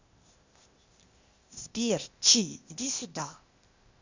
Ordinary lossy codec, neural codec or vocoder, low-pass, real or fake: Opus, 64 kbps; codec, 16 kHz, 0.8 kbps, ZipCodec; 7.2 kHz; fake